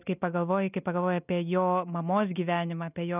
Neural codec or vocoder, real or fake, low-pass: none; real; 3.6 kHz